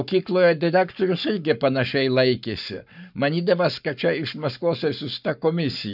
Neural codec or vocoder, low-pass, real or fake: codec, 44.1 kHz, 7.8 kbps, Pupu-Codec; 5.4 kHz; fake